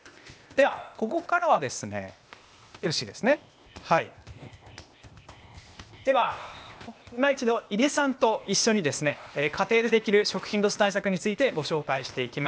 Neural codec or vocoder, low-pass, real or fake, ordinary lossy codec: codec, 16 kHz, 0.8 kbps, ZipCodec; none; fake; none